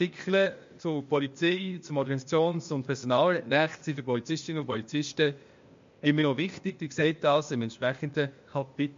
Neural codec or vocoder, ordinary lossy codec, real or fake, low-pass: codec, 16 kHz, 0.8 kbps, ZipCodec; MP3, 48 kbps; fake; 7.2 kHz